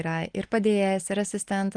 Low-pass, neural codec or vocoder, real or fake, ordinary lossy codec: 9.9 kHz; none; real; Opus, 24 kbps